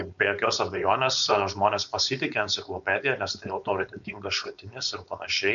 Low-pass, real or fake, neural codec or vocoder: 7.2 kHz; fake; codec, 16 kHz, 4.8 kbps, FACodec